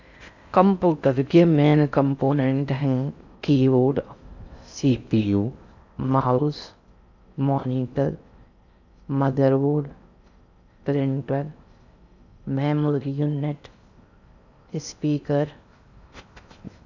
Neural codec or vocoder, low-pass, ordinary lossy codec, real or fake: codec, 16 kHz in and 24 kHz out, 0.6 kbps, FocalCodec, streaming, 4096 codes; 7.2 kHz; none; fake